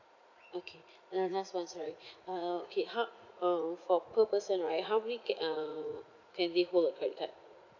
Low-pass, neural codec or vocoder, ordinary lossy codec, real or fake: 7.2 kHz; vocoder, 44.1 kHz, 80 mel bands, Vocos; none; fake